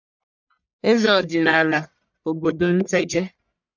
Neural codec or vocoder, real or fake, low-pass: codec, 44.1 kHz, 1.7 kbps, Pupu-Codec; fake; 7.2 kHz